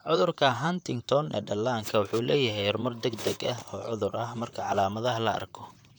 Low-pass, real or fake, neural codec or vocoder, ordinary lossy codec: none; fake; vocoder, 44.1 kHz, 128 mel bands, Pupu-Vocoder; none